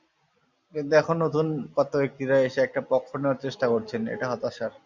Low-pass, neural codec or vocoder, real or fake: 7.2 kHz; none; real